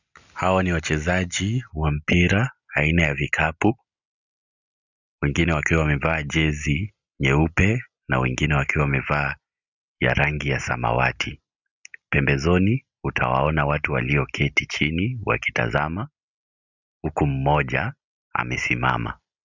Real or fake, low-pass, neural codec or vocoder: real; 7.2 kHz; none